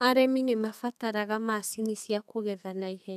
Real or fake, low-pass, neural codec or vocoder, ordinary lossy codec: fake; 14.4 kHz; codec, 32 kHz, 1.9 kbps, SNAC; none